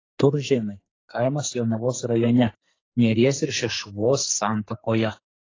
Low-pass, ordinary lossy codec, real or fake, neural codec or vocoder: 7.2 kHz; AAC, 32 kbps; fake; codec, 44.1 kHz, 2.6 kbps, SNAC